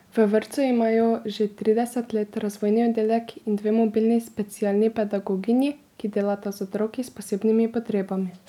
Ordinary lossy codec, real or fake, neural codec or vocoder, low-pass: none; real; none; 19.8 kHz